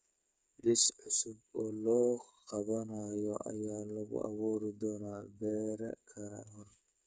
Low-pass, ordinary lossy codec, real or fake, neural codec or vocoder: none; none; fake; codec, 16 kHz, 8 kbps, FreqCodec, smaller model